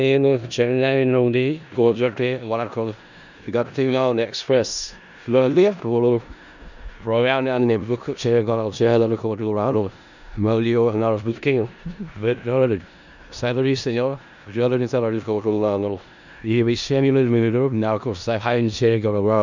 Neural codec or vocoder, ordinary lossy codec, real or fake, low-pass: codec, 16 kHz in and 24 kHz out, 0.4 kbps, LongCat-Audio-Codec, four codebook decoder; none; fake; 7.2 kHz